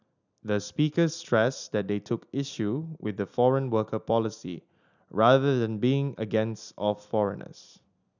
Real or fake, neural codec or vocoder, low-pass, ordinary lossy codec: real; none; 7.2 kHz; none